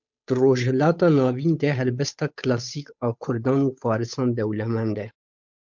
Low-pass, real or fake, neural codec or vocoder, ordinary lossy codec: 7.2 kHz; fake; codec, 16 kHz, 8 kbps, FunCodec, trained on Chinese and English, 25 frames a second; MP3, 64 kbps